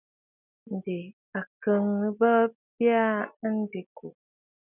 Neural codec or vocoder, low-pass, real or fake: none; 3.6 kHz; real